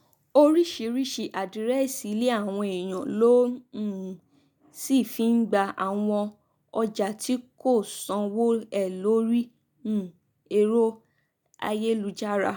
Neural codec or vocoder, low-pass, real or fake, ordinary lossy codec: none; none; real; none